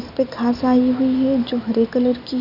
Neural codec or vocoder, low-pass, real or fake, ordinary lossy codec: none; 5.4 kHz; real; none